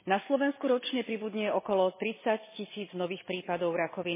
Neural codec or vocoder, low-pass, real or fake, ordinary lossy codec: none; 3.6 kHz; real; MP3, 16 kbps